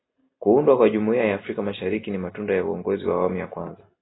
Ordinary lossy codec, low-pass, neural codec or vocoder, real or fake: AAC, 16 kbps; 7.2 kHz; none; real